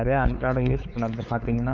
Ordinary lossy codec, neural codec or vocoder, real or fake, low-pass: Opus, 24 kbps; codec, 16 kHz, 4 kbps, X-Codec, WavLM features, trained on Multilingual LibriSpeech; fake; 7.2 kHz